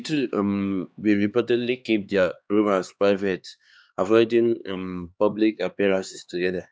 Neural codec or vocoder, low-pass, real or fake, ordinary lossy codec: codec, 16 kHz, 2 kbps, X-Codec, HuBERT features, trained on LibriSpeech; none; fake; none